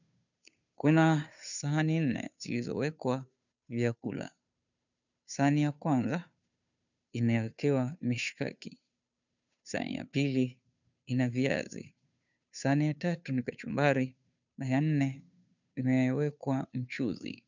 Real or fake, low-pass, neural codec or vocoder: fake; 7.2 kHz; codec, 16 kHz, 2 kbps, FunCodec, trained on Chinese and English, 25 frames a second